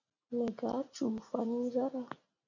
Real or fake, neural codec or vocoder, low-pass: real; none; 7.2 kHz